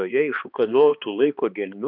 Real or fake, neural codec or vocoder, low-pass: fake; codec, 16 kHz, 2 kbps, X-Codec, HuBERT features, trained on balanced general audio; 5.4 kHz